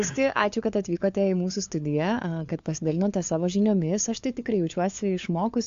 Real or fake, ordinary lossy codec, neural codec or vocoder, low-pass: fake; AAC, 64 kbps; codec, 16 kHz, 4 kbps, FunCodec, trained on LibriTTS, 50 frames a second; 7.2 kHz